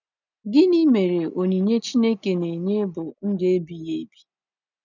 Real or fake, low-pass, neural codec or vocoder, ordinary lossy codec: real; 7.2 kHz; none; none